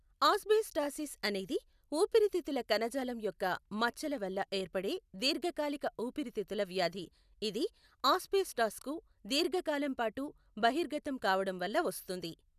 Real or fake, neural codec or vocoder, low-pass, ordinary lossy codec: real; none; 14.4 kHz; none